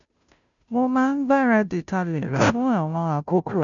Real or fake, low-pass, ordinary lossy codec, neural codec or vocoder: fake; 7.2 kHz; none; codec, 16 kHz, 0.5 kbps, FunCodec, trained on Chinese and English, 25 frames a second